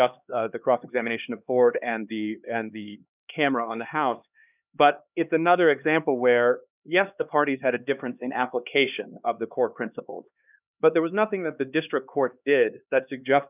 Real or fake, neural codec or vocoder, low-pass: fake; codec, 16 kHz, 2 kbps, X-Codec, HuBERT features, trained on LibriSpeech; 3.6 kHz